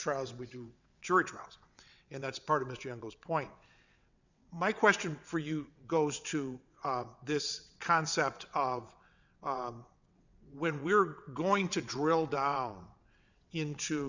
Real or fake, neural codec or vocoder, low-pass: fake; vocoder, 22.05 kHz, 80 mel bands, WaveNeXt; 7.2 kHz